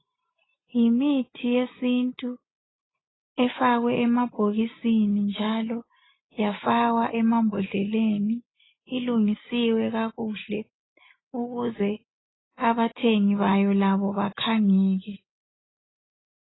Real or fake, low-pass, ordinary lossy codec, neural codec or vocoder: real; 7.2 kHz; AAC, 16 kbps; none